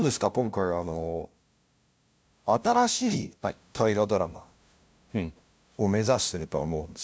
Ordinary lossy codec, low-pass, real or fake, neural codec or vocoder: none; none; fake; codec, 16 kHz, 0.5 kbps, FunCodec, trained on LibriTTS, 25 frames a second